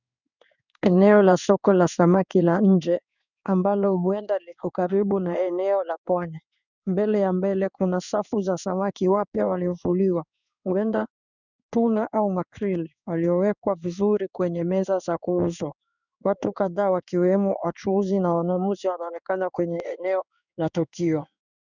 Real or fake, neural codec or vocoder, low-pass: fake; codec, 16 kHz in and 24 kHz out, 1 kbps, XY-Tokenizer; 7.2 kHz